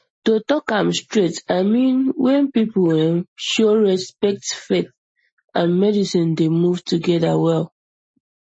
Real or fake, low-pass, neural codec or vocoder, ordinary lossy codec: real; 9.9 kHz; none; MP3, 32 kbps